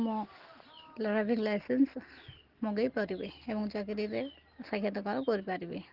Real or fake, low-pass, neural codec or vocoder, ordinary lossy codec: real; 5.4 kHz; none; Opus, 16 kbps